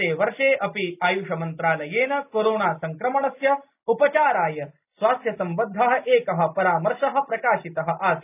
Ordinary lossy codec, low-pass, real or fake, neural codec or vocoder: none; 3.6 kHz; real; none